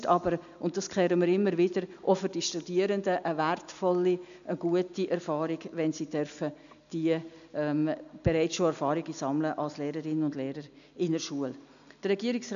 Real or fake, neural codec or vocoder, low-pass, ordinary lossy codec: real; none; 7.2 kHz; none